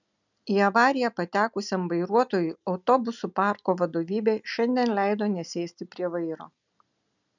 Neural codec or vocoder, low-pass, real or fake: none; 7.2 kHz; real